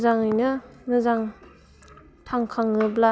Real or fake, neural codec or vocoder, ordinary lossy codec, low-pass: real; none; none; none